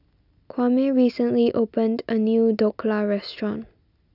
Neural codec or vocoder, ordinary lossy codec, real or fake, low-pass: none; none; real; 5.4 kHz